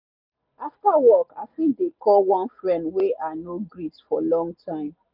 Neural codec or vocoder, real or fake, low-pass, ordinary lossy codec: none; real; 5.4 kHz; none